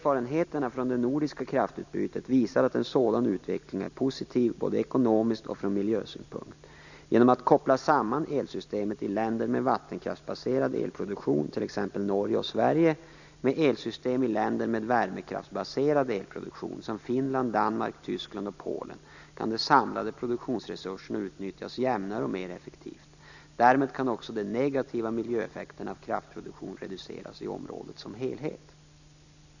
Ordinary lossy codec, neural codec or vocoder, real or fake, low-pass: none; none; real; 7.2 kHz